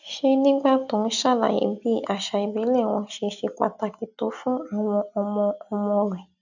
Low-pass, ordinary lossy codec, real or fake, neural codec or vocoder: 7.2 kHz; none; real; none